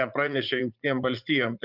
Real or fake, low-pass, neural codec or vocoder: fake; 5.4 kHz; vocoder, 44.1 kHz, 80 mel bands, Vocos